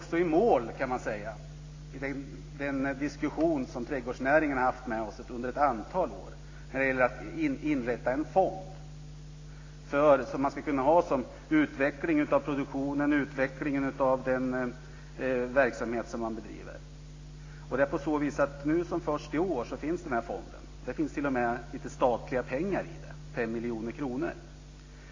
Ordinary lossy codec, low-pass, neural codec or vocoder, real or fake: AAC, 32 kbps; 7.2 kHz; none; real